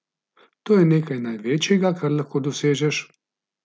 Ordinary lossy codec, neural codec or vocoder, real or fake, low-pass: none; none; real; none